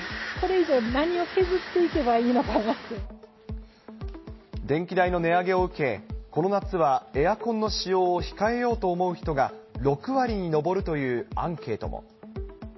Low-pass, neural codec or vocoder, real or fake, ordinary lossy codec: 7.2 kHz; none; real; MP3, 24 kbps